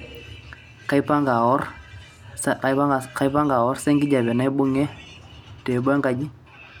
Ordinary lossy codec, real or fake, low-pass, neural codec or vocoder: none; real; 19.8 kHz; none